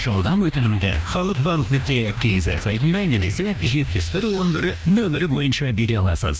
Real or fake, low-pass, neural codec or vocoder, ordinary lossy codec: fake; none; codec, 16 kHz, 1 kbps, FreqCodec, larger model; none